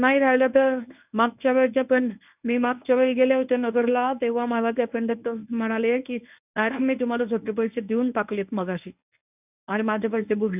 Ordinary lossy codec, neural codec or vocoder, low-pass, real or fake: none; codec, 24 kHz, 0.9 kbps, WavTokenizer, medium speech release version 1; 3.6 kHz; fake